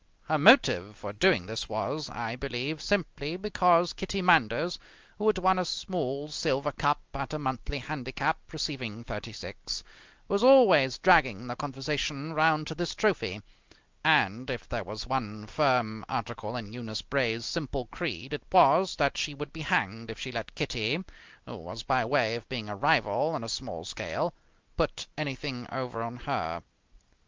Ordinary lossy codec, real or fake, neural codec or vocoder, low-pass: Opus, 24 kbps; real; none; 7.2 kHz